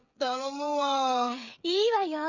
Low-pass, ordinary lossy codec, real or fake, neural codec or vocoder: 7.2 kHz; none; fake; codec, 16 kHz, 8 kbps, FreqCodec, smaller model